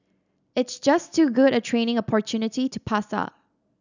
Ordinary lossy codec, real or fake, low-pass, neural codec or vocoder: none; real; 7.2 kHz; none